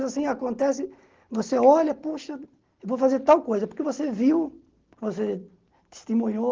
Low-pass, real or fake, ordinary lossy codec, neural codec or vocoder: 7.2 kHz; real; Opus, 16 kbps; none